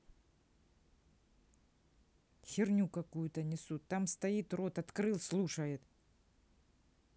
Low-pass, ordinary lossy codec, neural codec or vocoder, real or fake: none; none; none; real